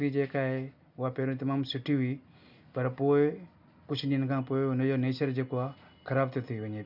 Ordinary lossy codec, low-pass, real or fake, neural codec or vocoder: none; 5.4 kHz; real; none